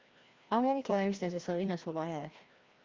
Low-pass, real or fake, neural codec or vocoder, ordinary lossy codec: 7.2 kHz; fake; codec, 16 kHz, 1 kbps, FreqCodec, larger model; Opus, 32 kbps